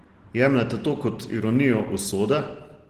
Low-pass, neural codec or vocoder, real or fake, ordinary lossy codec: 14.4 kHz; none; real; Opus, 16 kbps